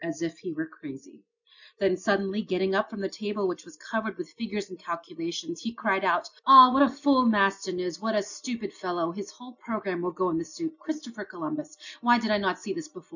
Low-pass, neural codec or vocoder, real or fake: 7.2 kHz; none; real